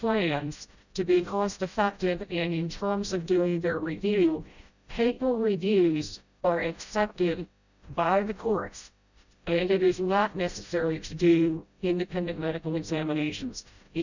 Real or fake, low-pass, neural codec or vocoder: fake; 7.2 kHz; codec, 16 kHz, 0.5 kbps, FreqCodec, smaller model